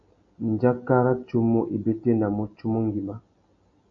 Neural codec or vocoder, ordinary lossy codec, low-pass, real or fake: none; AAC, 64 kbps; 7.2 kHz; real